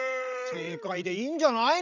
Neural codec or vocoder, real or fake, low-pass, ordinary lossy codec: codec, 16 kHz, 16 kbps, FreqCodec, larger model; fake; 7.2 kHz; none